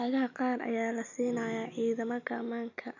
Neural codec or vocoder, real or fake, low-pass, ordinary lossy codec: none; real; 7.2 kHz; none